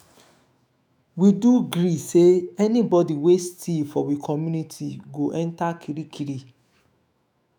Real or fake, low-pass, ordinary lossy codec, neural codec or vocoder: fake; none; none; autoencoder, 48 kHz, 128 numbers a frame, DAC-VAE, trained on Japanese speech